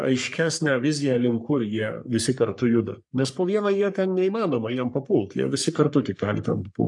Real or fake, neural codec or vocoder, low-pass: fake; codec, 44.1 kHz, 3.4 kbps, Pupu-Codec; 10.8 kHz